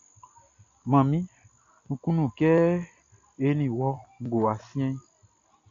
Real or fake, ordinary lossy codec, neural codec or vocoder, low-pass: real; AAC, 48 kbps; none; 7.2 kHz